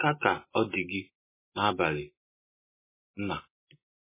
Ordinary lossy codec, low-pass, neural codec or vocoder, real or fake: MP3, 16 kbps; 3.6 kHz; none; real